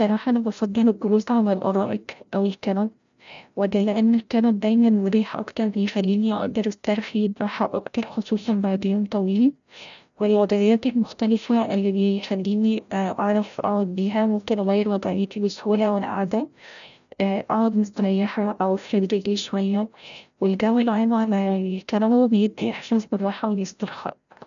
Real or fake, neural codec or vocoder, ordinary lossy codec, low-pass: fake; codec, 16 kHz, 0.5 kbps, FreqCodec, larger model; none; 7.2 kHz